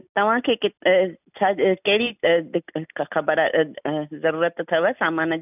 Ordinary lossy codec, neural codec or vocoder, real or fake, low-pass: none; none; real; 3.6 kHz